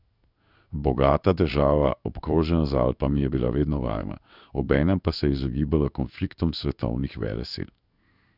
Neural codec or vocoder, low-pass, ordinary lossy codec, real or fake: codec, 16 kHz in and 24 kHz out, 1 kbps, XY-Tokenizer; 5.4 kHz; none; fake